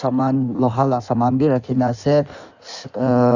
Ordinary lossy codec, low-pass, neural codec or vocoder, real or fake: none; 7.2 kHz; codec, 16 kHz in and 24 kHz out, 1.1 kbps, FireRedTTS-2 codec; fake